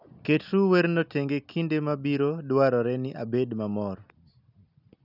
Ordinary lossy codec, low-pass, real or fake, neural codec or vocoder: none; 5.4 kHz; real; none